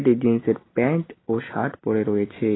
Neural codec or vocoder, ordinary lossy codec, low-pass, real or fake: none; AAC, 16 kbps; 7.2 kHz; real